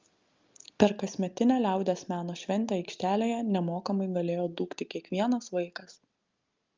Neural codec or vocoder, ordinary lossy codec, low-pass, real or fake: none; Opus, 24 kbps; 7.2 kHz; real